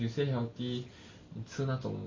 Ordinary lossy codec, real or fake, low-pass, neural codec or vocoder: MP3, 32 kbps; real; 7.2 kHz; none